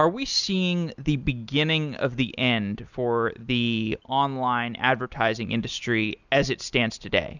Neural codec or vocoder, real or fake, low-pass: none; real; 7.2 kHz